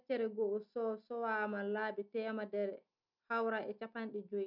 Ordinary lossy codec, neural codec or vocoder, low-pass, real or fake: none; none; 5.4 kHz; real